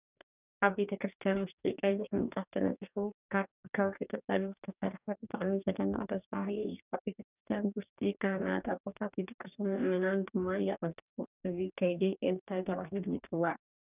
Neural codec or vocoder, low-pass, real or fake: codec, 44.1 kHz, 2.6 kbps, DAC; 3.6 kHz; fake